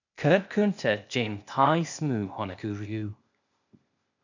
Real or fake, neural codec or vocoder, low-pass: fake; codec, 16 kHz, 0.8 kbps, ZipCodec; 7.2 kHz